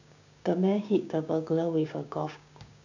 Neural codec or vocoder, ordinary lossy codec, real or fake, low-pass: codec, 16 kHz, 6 kbps, DAC; none; fake; 7.2 kHz